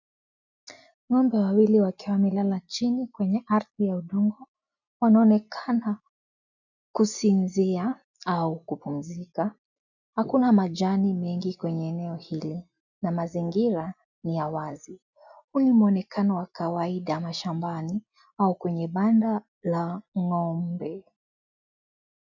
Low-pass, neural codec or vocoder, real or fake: 7.2 kHz; none; real